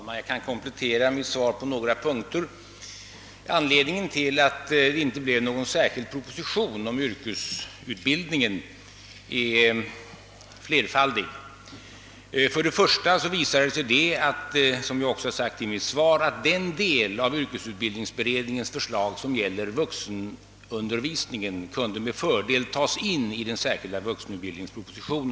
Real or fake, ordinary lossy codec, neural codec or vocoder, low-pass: real; none; none; none